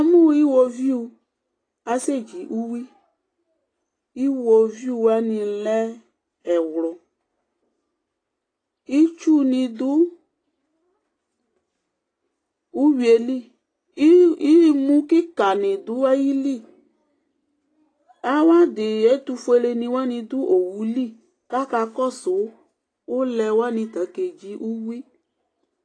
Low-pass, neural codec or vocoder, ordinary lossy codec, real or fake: 9.9 kHz; none; AAC, 32 kbps; real